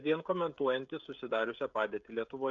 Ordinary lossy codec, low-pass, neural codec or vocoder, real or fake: MP3, 64 kbps; 7.2 kHz; codec, 16 kHz, 16 kbps, FreqCodec, smaller model; fake